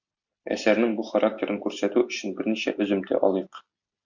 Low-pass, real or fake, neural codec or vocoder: 7.2 kHz; real; none